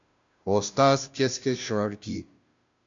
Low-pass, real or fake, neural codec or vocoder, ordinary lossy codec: 7.2 kHz; fake; codec, 16 kHz, 0.5 kbps, FunCodec, trained on Chinese and English, 25 frames a second; AAC, 64 kbps